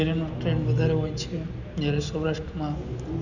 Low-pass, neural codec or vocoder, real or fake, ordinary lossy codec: 7.2 kHz; none; real; none